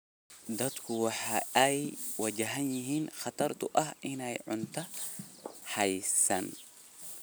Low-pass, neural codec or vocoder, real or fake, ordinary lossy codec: none; none; real; none